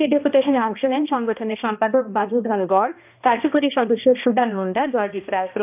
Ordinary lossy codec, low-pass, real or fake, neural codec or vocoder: none; 3.6 kHz; fake; codec, 16 kHz, 1 kbps, X-Codec, HuBERT features, trained on balanced general audio